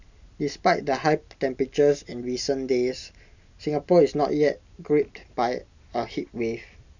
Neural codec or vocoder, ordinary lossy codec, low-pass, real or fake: none; none; 7.2 kHz; real